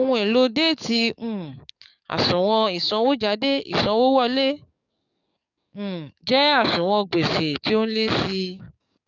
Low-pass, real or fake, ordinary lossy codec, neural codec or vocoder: 7.2 kHz; fake; none; codec, 44.1 kHz, 7.8 kbps, DAC